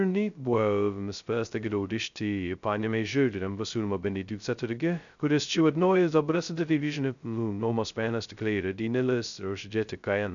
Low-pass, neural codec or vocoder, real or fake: 7.2 kHz; codec, 16 kHz, 0.2 kbps, FocalCodec; fake